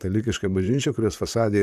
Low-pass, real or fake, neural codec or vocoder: 14.4 kHz; fake; vocoder, 44.1 kHz, 128 mel bands, Pupu-Vocoder